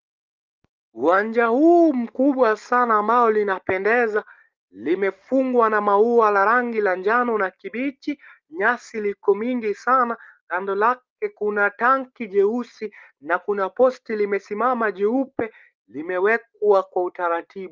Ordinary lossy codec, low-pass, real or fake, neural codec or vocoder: Opus, 32 kbps; 7.2 kHz; real; none